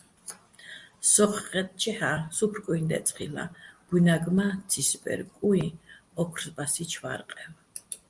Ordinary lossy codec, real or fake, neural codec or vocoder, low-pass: Opus, 32 kbps; real; none; 10.8 kHz